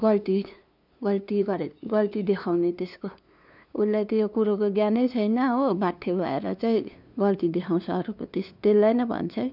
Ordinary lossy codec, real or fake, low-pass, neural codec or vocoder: none; fake; 5.4 kHz; codec, 16 kHz, 2 kbps, FunCodec, trained on LibriTTS, 25 frames a second